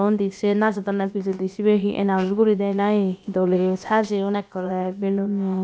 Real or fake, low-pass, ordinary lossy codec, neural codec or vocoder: fake; none; none; codec, 16 kHz, about 1 kbps, DyCAST, with the encoder's durations